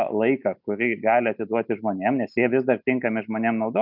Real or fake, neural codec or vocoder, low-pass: real; none; 5.4 kHz